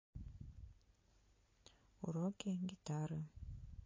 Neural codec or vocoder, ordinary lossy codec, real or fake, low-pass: none; MP3, 32 kbps; real; 7.2 kHz